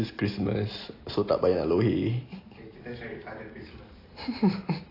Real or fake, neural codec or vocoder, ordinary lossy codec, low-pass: real; none; AAC, 24 kbps; 5.4 kHz